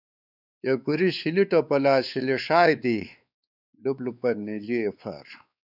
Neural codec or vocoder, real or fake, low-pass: codec, 16 kHz, 4 kbps, X-Codec, WavLM features, trained on Multilingual LibriSpeech; fake; 5.4 kHz